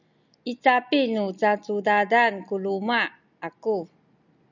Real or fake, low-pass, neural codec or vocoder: real; 7.2 kHz; none